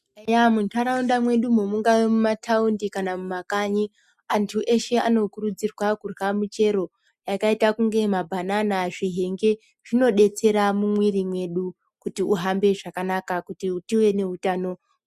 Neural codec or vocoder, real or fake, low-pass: none; real; 14.4 kHz